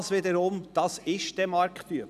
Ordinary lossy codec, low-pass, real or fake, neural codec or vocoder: none; 14.4 kHz; real; none